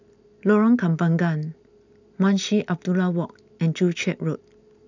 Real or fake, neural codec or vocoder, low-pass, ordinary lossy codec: real; none; 7.2 kHz; none